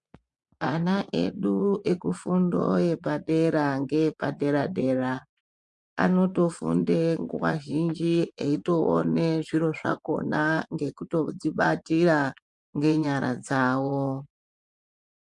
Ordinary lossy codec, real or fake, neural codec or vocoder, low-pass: MP3, 64 kbps; fake; vocoder, 24 kHz, 100 mel bands, Vocos; 10.8 kHz